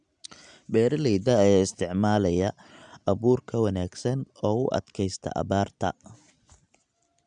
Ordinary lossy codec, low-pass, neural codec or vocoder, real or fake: none; 9.9 kHz; none; real